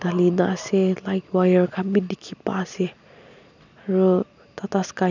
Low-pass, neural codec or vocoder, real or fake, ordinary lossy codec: 7.2 kHz; none; real; none